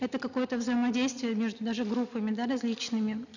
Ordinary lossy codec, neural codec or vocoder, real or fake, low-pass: none; none; real; 7.2 kHz